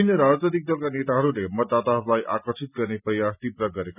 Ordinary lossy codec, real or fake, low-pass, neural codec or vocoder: none; real; 3.6 kHz; none